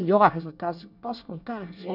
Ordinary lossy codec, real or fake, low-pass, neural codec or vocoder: none; fake; 5.4 kHz; codec, 24 kHz, 1 kbps, SNAC